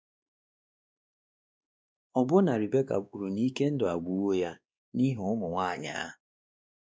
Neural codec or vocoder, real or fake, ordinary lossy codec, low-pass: codec, 16 kHz, 2 kbps, X-Codec, WavLM features, trained on Multilingual LibriSpeech; fake; none; none